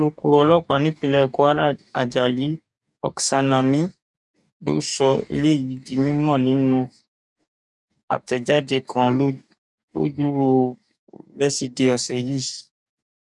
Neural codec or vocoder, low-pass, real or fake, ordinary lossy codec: codec, 44.1 kHz, 2.6 kbps, DAC; 10.8 kHz; fake; none